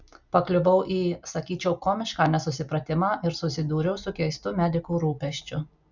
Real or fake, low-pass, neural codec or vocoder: real; 7.2 kHz; none